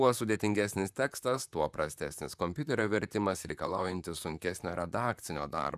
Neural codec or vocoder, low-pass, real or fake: vocoder, 44.1 kHz, 128 mel bands, Pupu-Vocoder; 14.4 kHz; fake